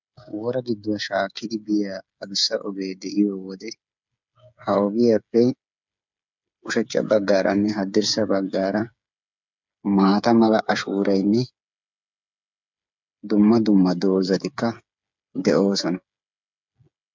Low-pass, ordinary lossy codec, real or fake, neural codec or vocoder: 7.2 kHz; MP3, 64 kbps; fake; codec, 16 kHz, 8 kbps, FreqCodec, smaller model